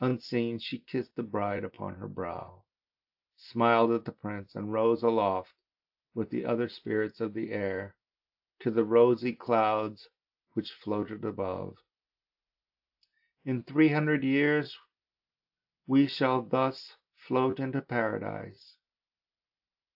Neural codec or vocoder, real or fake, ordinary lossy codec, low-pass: none; real; AAC, 48 kbps; 5.4 kHz